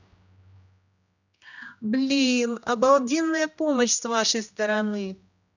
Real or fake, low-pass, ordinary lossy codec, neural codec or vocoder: fake; 7.2 kHz; none; codec, 16 kHz, 1 kbps, X-Codec, HuBERT features, trained on general audio